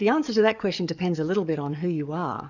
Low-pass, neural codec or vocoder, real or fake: 7.2 kHz; codec, 44.1 kHz, 7.8 kbps, DAC; fake